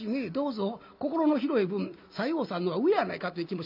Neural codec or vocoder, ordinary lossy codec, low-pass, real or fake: none; MP3, 48 kbps; 5.4 kHz; real